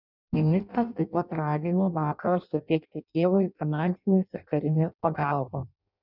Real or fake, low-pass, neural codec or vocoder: fake; 5.4 kHz; codec, 16 kHz in and 24 kHz out, 0.6 kbps, FireRedTTS-2 codec